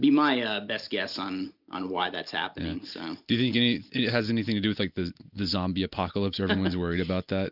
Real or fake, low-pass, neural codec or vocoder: real; 5.4 kHz; none